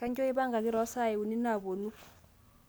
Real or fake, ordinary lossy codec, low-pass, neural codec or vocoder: real; none; none; none